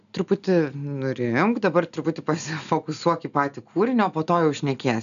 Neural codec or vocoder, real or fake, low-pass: none; real; 7.2 kHz